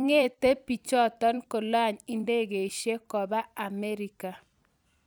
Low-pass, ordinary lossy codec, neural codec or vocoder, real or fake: none; none; vocoder, 44.1 kHz, 128 mel bands every 256 samples, BigVGAN v2; fake